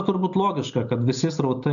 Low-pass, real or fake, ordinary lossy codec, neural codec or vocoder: 7.2 kHz; real; MP3, 96 kbps; none